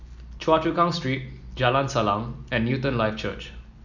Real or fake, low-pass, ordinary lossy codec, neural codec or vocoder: real; 7.2 kHz; none; none